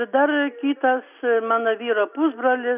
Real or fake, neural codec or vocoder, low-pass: real; none; 3.6 kHz